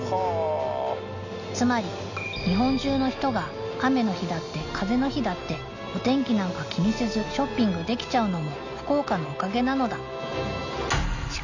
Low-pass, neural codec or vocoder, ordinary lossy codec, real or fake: 7.2 kHz; none; none; real